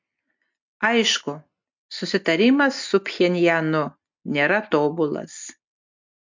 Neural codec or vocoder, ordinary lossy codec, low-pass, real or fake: none; MP3, 64 kbps; 7.2 kHz; real